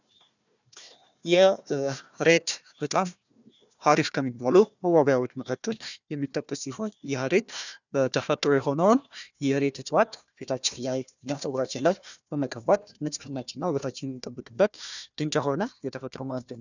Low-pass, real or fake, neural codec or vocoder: 7.2 kHz; fake; codec, 16 kHz, 1 kbps, FunCodec, trained on Chinese and English, 50 frames a second